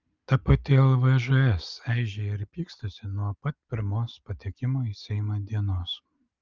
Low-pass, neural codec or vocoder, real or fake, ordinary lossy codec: 7.2 kHz; none; real; Opus, 24 kbps